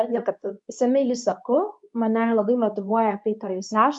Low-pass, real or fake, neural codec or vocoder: 10.8 kHz; fake; codec, 24 kHz, 0.9 kbps, WavTokenizer, medium speech release version 2